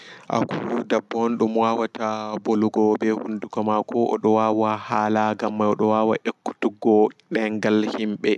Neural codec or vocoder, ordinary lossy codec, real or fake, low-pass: none; none; real; none